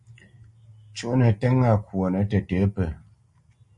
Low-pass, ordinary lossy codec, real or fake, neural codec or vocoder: 10.8 kHz; MP3, 48 kbps; real; none